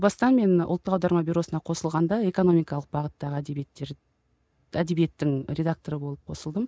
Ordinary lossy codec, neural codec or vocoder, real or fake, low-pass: none; none; real; none